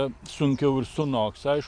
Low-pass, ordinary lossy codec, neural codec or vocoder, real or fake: 9.9 kHz; AAC, 64 kbps; vocoder, 44.1 kHz, 128 mel bands every 512 samples, BigVGAN v2; fake